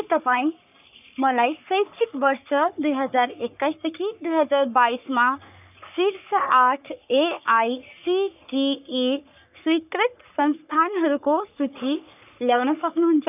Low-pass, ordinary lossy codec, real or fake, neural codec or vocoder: 3.6 kHz; none; fake; codec, 44.1 kHz, 3.4 kbps, Pupu-Codec